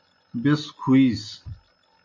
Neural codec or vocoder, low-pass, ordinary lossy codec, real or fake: none; 7.2 kHz; MP3, 32 kbps; real